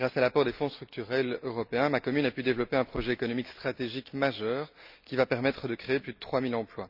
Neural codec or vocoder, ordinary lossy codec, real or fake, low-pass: none; none; real; 5.4 kHz